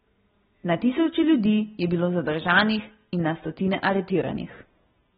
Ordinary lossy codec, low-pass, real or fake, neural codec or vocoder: AAC, 16 kbps; 7.2 kHz; real; none